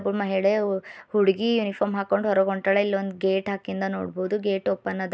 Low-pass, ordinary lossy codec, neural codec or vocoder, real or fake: none; none; none; real